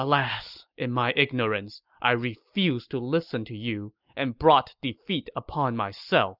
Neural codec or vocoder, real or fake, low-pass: none; real; 5.4 kHz